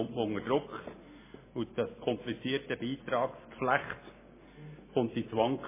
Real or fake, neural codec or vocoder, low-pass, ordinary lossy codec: real; none; 3.6 kHz; MP3, 16 kbps